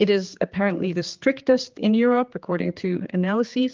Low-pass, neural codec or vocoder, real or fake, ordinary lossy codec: 7.2 kHz; codec, 16 kHz, 4 kbps, X-Codec, HuBERT features, trained on general audio; fake; Opus, 32 kbps